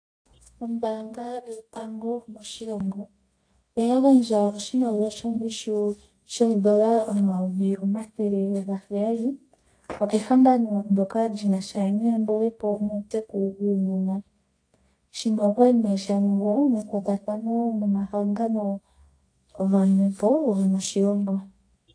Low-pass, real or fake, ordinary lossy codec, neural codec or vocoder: 9.9 kHz; fake; AAC, 48 kbps; codec, 24 kHz, 0.9 kbps, WavTokenizer, medium music audio release